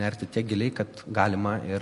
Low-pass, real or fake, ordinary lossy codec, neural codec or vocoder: 14.4 kHz; real; MP3, 48 kbps; none